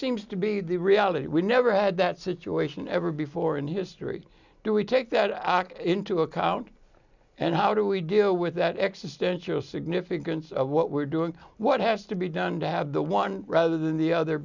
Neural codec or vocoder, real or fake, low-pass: none; real; 7.2 kHz